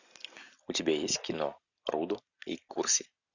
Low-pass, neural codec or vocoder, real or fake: 7.2 kHz; none; real